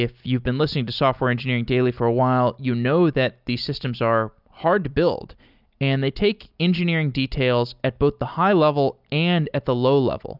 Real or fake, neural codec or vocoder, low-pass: real; none; 5.4 kHz